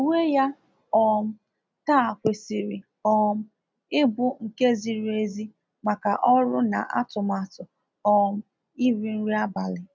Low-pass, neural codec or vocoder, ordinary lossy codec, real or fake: none; none; none; real